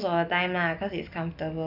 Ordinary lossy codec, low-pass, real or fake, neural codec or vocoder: none; 5.4 kHz; real; none